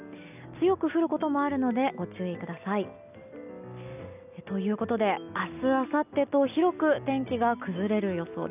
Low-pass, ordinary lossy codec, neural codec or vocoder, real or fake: 3.6 kHz; none; none; real